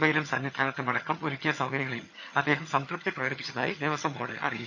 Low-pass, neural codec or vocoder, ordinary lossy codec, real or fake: 7.2 kHz; vocoder, 22.05 kHz, 80 mel bands, HiFi-GAN; none; fake